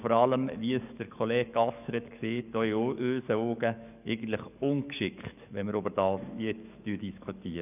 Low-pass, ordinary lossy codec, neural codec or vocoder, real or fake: 3.6 kHz; none; codec, 44.1 kHz, 7.8 kbps, DAC; fake